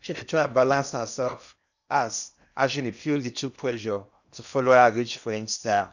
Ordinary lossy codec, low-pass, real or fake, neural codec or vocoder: none; 7.2 kHz; fake; codec, 16 kHz in and 24 kHz out, 0.8 kbps, FocalCodec, streaming, 65536 codes